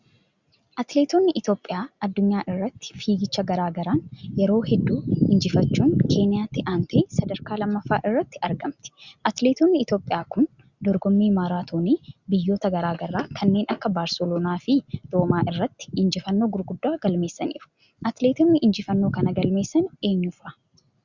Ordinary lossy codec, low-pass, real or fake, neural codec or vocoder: Opus, 64 kbps; 7.2 kHz; real; none